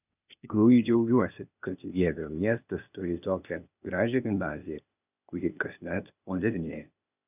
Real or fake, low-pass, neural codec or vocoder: fake; 3.6 kHz; codec, 16 kHz, 0.8 kbps, ZipCodec